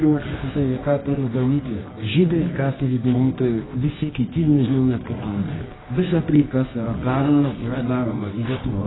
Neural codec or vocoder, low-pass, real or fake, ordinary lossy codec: codec, 24 kHz, 0.9 kbps, WavTokenizer, medium music audio release; 7.2 kHz; fake; AAC, 16 kbps